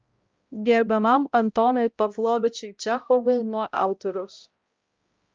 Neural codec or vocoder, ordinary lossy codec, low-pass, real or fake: codec, 16 kHz, 0.5 kbps, X-Codec, HuBERT features, trained on balanced general audio; Opus, 24 kbps; 7.2 kHz; fake